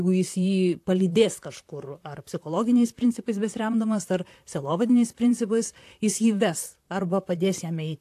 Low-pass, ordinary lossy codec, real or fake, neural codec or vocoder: 14.4 kHz; AAC, 64 kbps; fake; vocoder, 44.1 kHz, 128 mel bands, Pupu-Vocoder